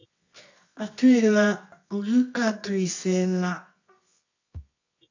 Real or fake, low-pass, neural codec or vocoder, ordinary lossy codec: fake; 7.2 kHz; codec, 24 kHz, 0.9 kbps, WavTokenizer, medium music audio release; AAC, 48 kbps